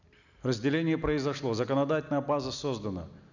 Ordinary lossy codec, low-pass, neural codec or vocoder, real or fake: none; 7.2 kHz; none; real